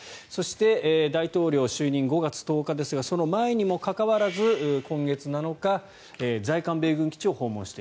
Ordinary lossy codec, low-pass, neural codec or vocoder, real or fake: none; none; none; real